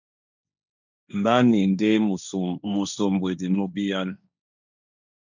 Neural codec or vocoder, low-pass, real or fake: codec, 16 kHz, 1.1 kbps, Voila-Tokenizer; 7.2 kHz; fake